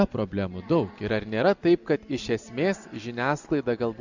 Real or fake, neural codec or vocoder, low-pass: real; none; 7.2 kHz